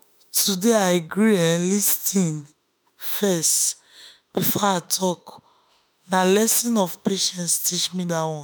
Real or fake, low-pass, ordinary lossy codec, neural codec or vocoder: fake; none; none; autoencoder, 48 kHz, 32 numbers a frame, DAC-VAE, trained on Japanese speech